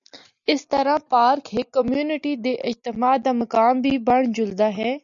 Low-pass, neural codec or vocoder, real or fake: 7.2 kHz; none; real